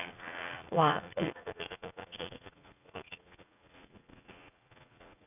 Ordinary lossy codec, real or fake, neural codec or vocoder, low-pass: none; fake; vocoder, 44.1 kHz, 80 mel bands, Vocos; 3.6 kHz